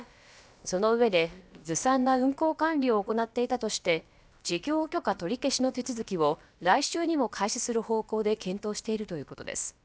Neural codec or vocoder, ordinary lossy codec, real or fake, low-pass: codec, 16 kHz, about 1 kbps, DyCAST, with the encoder's durations; none; fake; none